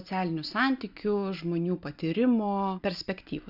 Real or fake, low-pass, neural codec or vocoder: real; 5.4 kHz; none